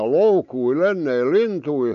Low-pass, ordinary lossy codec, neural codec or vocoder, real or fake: 7.2 kHz; none; none; real